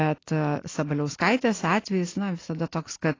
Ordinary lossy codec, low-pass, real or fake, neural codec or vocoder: AAC, 32 kbps; 7.2 kHz; real; none